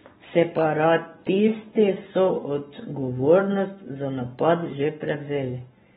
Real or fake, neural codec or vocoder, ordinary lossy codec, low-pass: fake; codec, 44.1 kHz, 7.8 kbps, Pupu-Codec; AAC, 16 kbps; 19.8 kHz